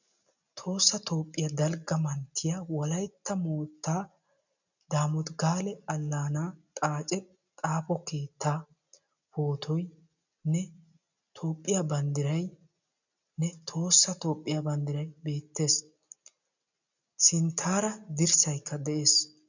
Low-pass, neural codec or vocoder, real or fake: 7.2 kHz; none; real